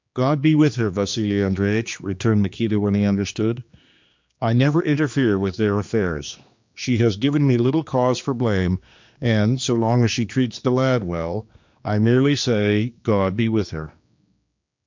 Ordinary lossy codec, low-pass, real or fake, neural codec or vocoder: MP3, 64 kbps; 7.2 kHz; fake; codec, 16 kHz, 2 kbps, X-Codec, HuBERT features, trained on general audio